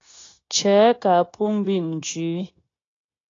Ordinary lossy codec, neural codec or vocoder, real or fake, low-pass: AAC, 32 kbps; codec, 16 kHz, 0.9 kbps, LongCat-Audio-Codec; fake; 7.2 kHz